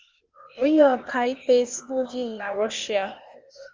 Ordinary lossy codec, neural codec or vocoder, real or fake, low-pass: Opus, 32 kbps; codec, 16 kHz, 0.8 kbps, ZipCodec; fake; 7.2 kHz